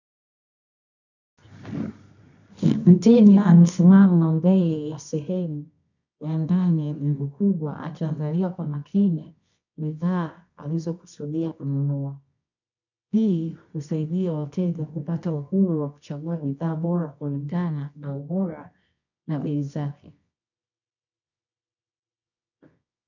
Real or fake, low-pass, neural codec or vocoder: fake; 7.2 kHz; codec, 24 kHz, 0.9 kbps, WavTokenizer, medium music audio release